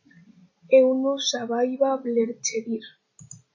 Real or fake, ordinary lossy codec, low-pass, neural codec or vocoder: real; MP3, 32 kbps; 7.2 kHz; none